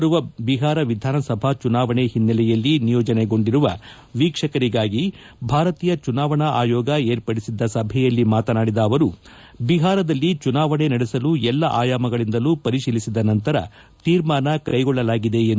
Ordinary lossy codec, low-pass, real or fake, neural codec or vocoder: none; none; real; none